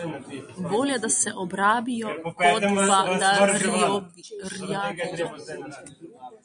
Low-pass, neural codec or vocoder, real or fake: 9.9 kHz; none; real